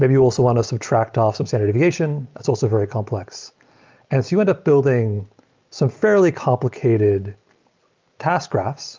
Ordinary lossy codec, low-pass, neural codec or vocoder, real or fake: Opus, 24 kbps; 7.2 kHz; none; real